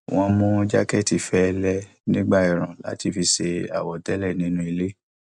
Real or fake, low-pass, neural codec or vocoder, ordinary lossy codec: real; 10.8 kHz; none; none